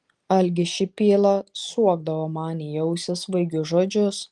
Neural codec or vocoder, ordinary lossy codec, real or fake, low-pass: none; Opus, 24 kbps; real; 10.8 kHz